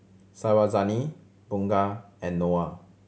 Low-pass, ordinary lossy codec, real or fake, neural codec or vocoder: none; none; real; none